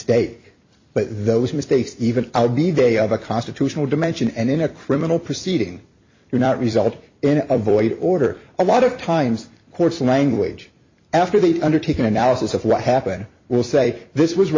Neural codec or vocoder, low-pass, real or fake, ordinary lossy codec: vocoder, 44.1 kHz, 128 mel bands every 256 samples, BigVGAN v2; 7.2 kHz; fake; MP3, 32 kbps